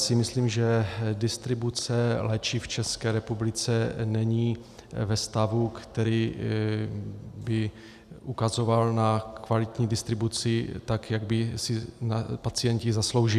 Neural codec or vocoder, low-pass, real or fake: none; 14.4 kHz; real